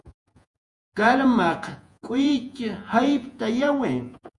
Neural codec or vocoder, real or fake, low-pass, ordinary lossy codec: vocoder, 48 kHz, 128 mel bands, Vocos; fake; 10.8 kHz; MP3, 64 kbps